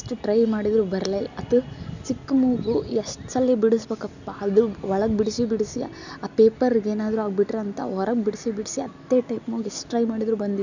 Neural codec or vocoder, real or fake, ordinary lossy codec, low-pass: none; real; none; 7.2 kHz